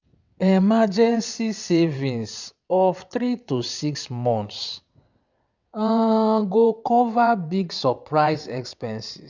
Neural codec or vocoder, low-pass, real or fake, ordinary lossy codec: vocoder, 22.05 kHz, 80 mel bands, WaveNeXt; 7.2 kHz; fake; none